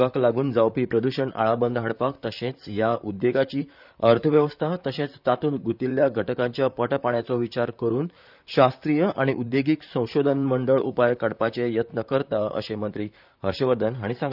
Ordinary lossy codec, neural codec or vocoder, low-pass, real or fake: none; vocoder, 44.1 kHz, 128 mel bands, Pupu-Vocoder; 5.4 kHz; fake